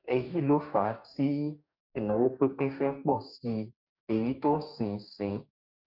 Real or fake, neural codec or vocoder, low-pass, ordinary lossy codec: fake; codec, 44.1 kHz, 2.6 kbps, DAC; 5.4 kHz; none